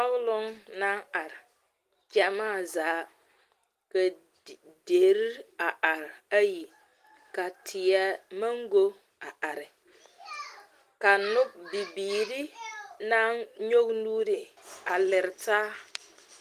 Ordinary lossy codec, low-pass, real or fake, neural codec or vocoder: Opus, 24 kbps; 14.4 kHz; real; none